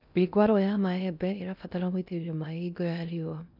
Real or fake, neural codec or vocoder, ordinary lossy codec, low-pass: fake; codec, 16 kHz in and 24 kHz out, 0.6 kbps, FocalCodec, streaming, 2048 codes; none; 5.4 kHz